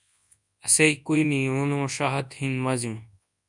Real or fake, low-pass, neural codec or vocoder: fake; 10.8 kHz; codec, 24 kHz, 0.9 kbps, WavTokenizer, large speech release